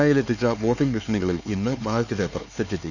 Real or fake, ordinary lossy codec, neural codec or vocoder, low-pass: fake; none; codec, 16 kHz, 4 kbps, X-Codec, HuBERT features, trained on LibriSpeech; 7.2 kHz